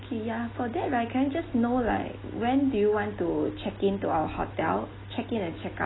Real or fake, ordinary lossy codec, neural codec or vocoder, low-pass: real; AAC, 16 kbps; none; 7.2 kHz